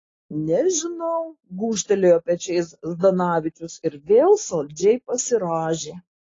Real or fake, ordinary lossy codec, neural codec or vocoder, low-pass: real; AAC, 32 kbps; none; 7.2 kHz